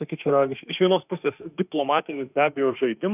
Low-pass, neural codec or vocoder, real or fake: 3.6 kHz; autoencoder, 48 kHz, 32 numbers a frame, DAC-VAE, trained on Japanese speech; fake